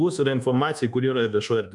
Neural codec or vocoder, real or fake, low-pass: codec, 24 kHz, 1.2 kbps, DualCodec; fake; 10.8 kHz